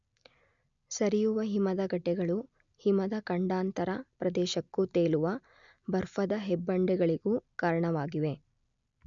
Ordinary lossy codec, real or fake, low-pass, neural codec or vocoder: none; real; 7.2 kHz; none